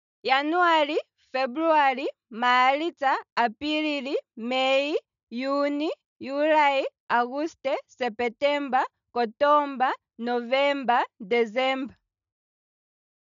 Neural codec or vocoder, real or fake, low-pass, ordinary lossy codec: none; real; 7.2 kHz; none